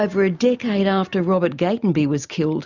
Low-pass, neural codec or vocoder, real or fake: 7.2 kHz; none; real